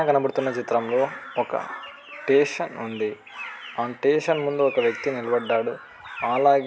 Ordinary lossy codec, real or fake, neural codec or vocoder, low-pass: none; real; none; none